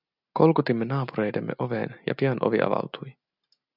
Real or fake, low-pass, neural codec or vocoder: real; 5.4 kHz; none